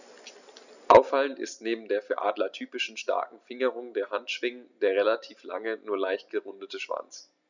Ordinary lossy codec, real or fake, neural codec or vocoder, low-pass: none; real; none; 7.2 kHz